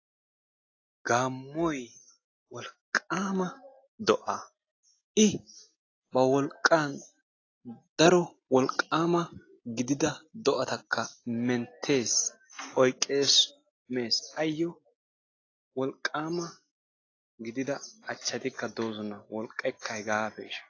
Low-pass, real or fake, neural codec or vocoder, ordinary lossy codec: 7.2 kHz; real; none; AAC, 32 kbps